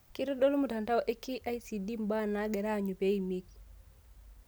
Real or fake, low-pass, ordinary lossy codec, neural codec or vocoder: real; none; none; none